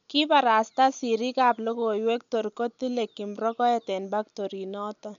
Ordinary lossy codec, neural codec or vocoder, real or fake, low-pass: none; none; real; 7.2 kHz